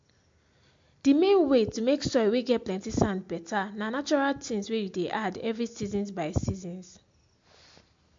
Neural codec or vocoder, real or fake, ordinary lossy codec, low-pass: none; real; MP3, 48 kbps; 7.2 kHz